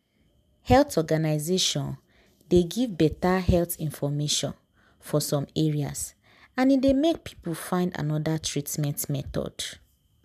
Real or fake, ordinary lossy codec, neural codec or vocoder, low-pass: real; none; none; 14.4 kHz